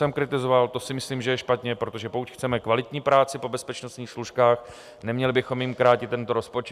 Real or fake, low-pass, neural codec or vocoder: real; 14.4 kHz; none